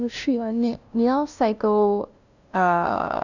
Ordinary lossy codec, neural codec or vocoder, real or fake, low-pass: none; codec, 16 kHz, 0.5 kbps, FunCodec, trained on LibriTTS, 25 frames a second; fake; 7.2 kHz